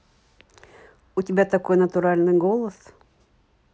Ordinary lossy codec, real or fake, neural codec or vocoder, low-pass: none; real; none; none